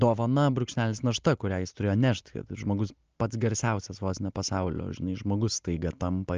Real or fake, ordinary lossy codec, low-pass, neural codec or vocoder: real; Opus, 24 kbps; 7.2 kHz; none